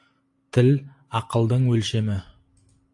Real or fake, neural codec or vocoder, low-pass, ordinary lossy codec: real; none; 10.8 kHz; AAC, 48 kbps